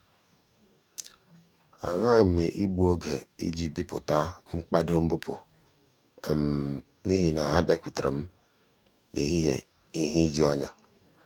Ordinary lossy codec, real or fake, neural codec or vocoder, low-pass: none; fake; codec, 44.1 kHz, 2.6 kbps, DAC; 19.8 kHz